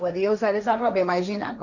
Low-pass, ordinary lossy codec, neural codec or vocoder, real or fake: 7.2 kHz; none; codec, 16 kHz, 1.1 kbps, Voila-Tokenizer; fake